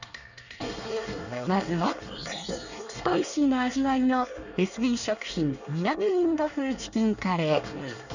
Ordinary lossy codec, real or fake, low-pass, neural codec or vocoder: none; fake; 7.2 kHz; codec, 24 kHz, 1 kbps, SNAC